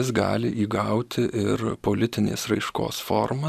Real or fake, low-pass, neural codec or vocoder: fake; 14.4 kHz; vocoder, 44.1 kHz, 128 mel bands every 512 samples, BigVGAN v2